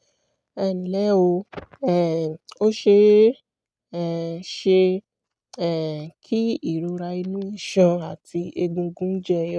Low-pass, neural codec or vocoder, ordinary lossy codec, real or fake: none; none; none; real